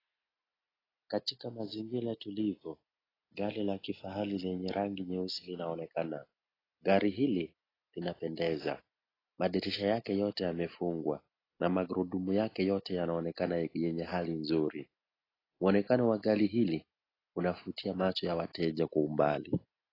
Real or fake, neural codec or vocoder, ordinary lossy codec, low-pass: real; none; AAC, 24 kbps; 5.4 kHz